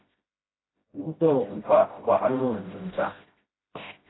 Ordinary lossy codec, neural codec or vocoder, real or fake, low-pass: AAC, 16 kbps; codec, 16 kHz, 0.5 kbps, FreqCodec, smaller model; fake; 7.2 kHz